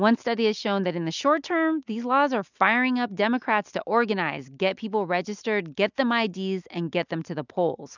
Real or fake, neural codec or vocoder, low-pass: real; none; 7.2 kHz